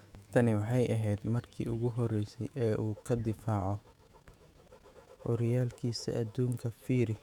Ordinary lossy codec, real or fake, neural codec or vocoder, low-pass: none; fake; autoencoder, 48 kHz, 128 numbers a frame, DAC-VAE, trained on Japanese speech; 19.8 kHz